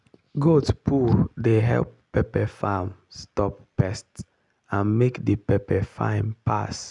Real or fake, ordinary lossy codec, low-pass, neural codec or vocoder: real; none; 10.8 kHz; none